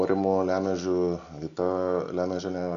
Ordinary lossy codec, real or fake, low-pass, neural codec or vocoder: Opus, 64 kbps; real; 7.2 kHz; none